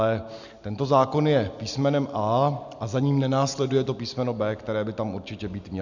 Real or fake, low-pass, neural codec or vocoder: real; 7.2 kHz; none